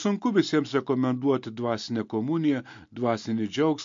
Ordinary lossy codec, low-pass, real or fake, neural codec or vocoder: MP3, 48 kbps; 7.2 kHz; real; none